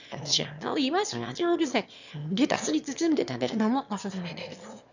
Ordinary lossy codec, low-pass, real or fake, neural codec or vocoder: none; 7.2 kHz; fake; autoencoder, 22.05 kHz, a latent of 192 numbers a frame, VITS, trained on one speaker